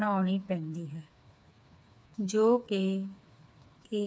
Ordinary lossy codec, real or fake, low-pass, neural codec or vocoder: none; fake; none; codec, 16 kHz, 4 kbps, FreqCodec, smaller model